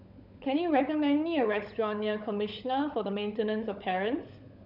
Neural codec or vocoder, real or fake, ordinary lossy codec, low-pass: codec, 16 kHz, 16 kbps, FunCodec, trained on LibriTTS, 50 frames a second; fake; none; 5.4 kHz